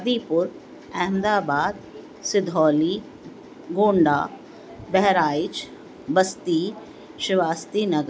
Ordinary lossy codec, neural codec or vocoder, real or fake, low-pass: none; none; real; none